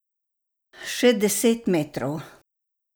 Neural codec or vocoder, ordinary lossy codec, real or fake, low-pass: none; none; real; none